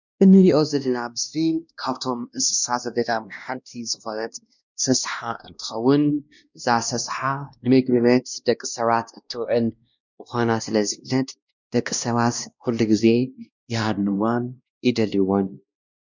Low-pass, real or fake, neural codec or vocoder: 7.2 kHz; fake; codec, 16 kHz, 1 kbps, X-Codec, WavLM features, trained on Multilingual LibriSpeech